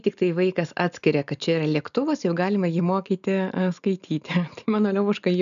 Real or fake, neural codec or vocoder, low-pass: real; none; 7.2 kHz